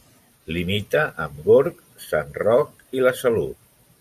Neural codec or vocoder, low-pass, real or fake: vocoder, 44.1 kHz, 128 mel bands every 512 samples, BigVGAN v2; 14.4 kHz; fake